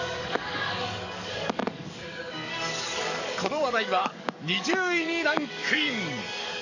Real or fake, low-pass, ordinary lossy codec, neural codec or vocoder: fake; 7.2 kHz; none; codec, 44.1 kHz, 7.8 kbps, DAC